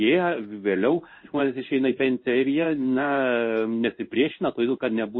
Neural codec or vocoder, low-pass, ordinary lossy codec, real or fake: codec, 16 kHz in and 24 kHz out, 1 kbps, XY-Tokenizer; 7.2 kHz; MP3, 24 kbps; fake